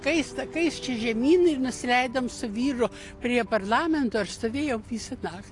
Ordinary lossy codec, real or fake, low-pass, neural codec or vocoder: AAC, 48 kbps; real; 10.8 kHz; none